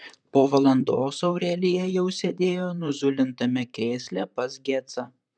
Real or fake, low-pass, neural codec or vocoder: fake; 9.9 kHz; vocoder, 44.1 kHz, 128 mel bands, Pupu-Vocoder